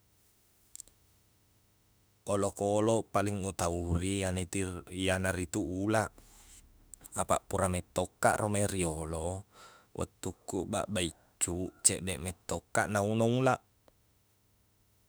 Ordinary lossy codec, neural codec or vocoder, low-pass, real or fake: none; autoencoder, 48 kHz, 32 numbers a frame, DAC-VAE, trained on Japanese speech; none; fake